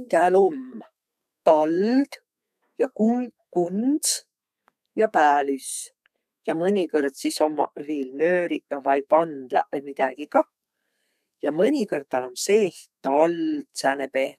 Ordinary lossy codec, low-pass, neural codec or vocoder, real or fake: none; 14.4 kHz; codec, 32 kHz, 1.9 kbps, SNAC; fake